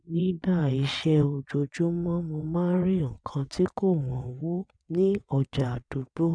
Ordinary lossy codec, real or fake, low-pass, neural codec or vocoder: none; fake; 9.9 kHz; vocoder, 22.05 kHz, 80 mel bands, WaveNeXt